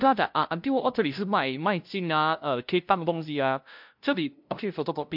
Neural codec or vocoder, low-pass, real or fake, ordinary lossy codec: codec, 16 kHz, 0.5 kbps, FunCodec, trained on LibriTTS, 25 frames a second; 5.4 kHz; fake; MP3, 48 kbps